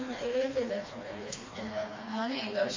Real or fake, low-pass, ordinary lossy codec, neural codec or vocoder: fake; 7.2 kHz; MP3, 32 kbps; codec, 16 kHz, 2 kbps, FreqCodec, smaller model